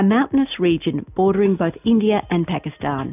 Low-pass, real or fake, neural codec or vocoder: 3.6 kHz; real; none